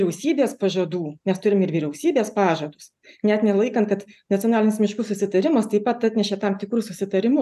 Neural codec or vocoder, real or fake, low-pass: none; real; 14.4 kHz